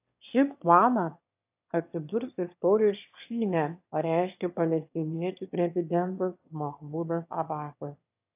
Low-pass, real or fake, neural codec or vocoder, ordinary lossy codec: 3.6 kHz; fake; autoencoder, 22.05 kHz, a latent of 192 numbers a frame, VITS, trained on one speaker; AAC, 32 kbps